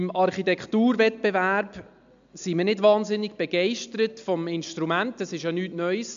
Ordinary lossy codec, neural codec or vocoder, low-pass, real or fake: MP3, 64 kbps; none; 7.2 kHz; real